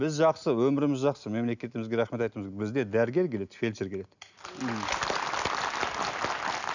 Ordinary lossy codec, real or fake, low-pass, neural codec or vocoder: none; real; 7.2 kHz; none